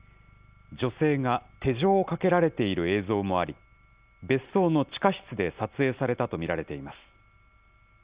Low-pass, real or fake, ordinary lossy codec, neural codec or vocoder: 3.6 kHz; real; Opus, 64 kbps; none